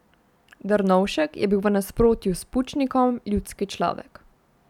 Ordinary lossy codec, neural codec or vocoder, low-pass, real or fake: none; none; 19.8 kHz; real